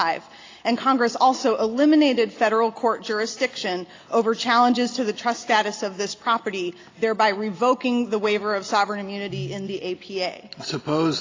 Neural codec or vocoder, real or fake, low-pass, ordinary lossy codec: none; real; 7.2 kHz; AAC, 32 kbps